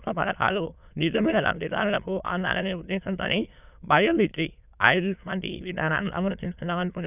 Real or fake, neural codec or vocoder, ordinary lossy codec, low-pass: fake; autoencoder, 22.05 kHz, a latent of 192 numbers a frame, VITS, trained on many speakers; none; 3.6 kHz